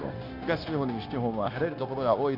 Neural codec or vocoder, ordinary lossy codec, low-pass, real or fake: codec, 16 kHz, 0.9 kbps, LongCat-Audio-Codec; none; 5.4 kHz; fake